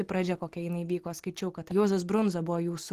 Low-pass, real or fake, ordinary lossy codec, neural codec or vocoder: 14.4 kHz; real; Opus, 16 kbps; none